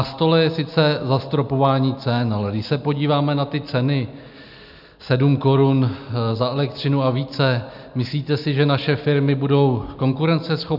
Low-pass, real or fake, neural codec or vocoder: 5.4 kHz; real; none